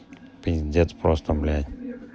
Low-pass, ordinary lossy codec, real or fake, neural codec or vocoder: none; none; real; none